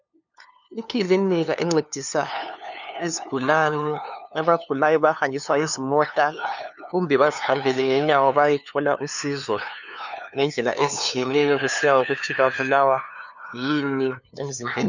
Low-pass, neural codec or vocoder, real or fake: 7.2 kHz; codec, 16 kHz, 2 kbps, FunCodec, trained on LibriTTS, 25 frames a second; fake